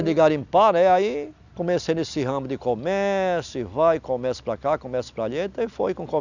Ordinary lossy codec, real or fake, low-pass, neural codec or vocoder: none; real; 7.2 kHz; none